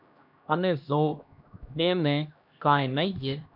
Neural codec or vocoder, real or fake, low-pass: codec, 16 kHz, 1 kbps, X-Codec, HuBERT features, trained on LibriSpeech; fake; 5.4 kHz